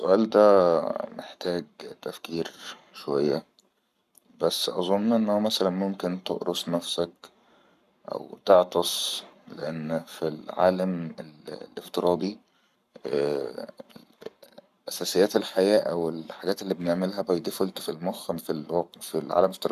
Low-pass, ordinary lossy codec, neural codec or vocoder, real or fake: 14.4 kHz; none; codec, 44.1 kHz, 7.8 kbps, Pupu-Codec; fake